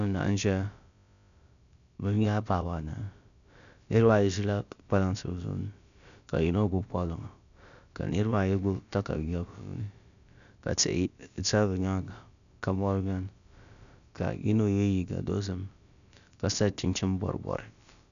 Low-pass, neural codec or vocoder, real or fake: 7.2 kHz; codec, 16 kHz, about 1 kbps, DyCAST, with the encoder's durations; fake